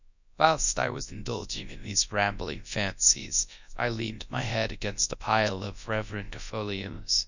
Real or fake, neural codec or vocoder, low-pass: fake; codec, 24 kHz, 0.9 kbps, WavTokenizer, large speech release; 7.2 kHz